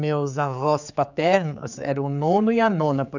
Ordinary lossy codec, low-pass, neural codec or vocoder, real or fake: none; 7.2 kHz; codec, 16 kHz, 4 kbps, X-Codec, HuBERT features, trained on general audio; fake